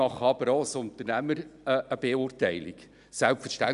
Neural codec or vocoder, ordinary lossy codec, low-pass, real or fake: none; none; 10.8 kHz; real